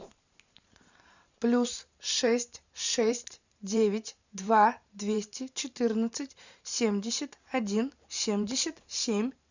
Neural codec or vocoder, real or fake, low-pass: vocoder, 44.1 kHz, 128 mel bands every 512 samples, BigVGAN v2; fake; 7.2 kHz